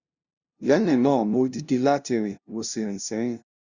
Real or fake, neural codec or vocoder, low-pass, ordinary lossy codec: fake; codec, 16 kHz, 0.5 kbps, FunCodec, trained on LibriTTS, 25 frames a second; 7.2 kHz; Opus, 64 kbps